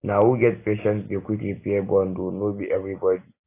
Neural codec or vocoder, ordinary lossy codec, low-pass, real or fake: none; AAC, 24 kbps; 3.6 kHz; real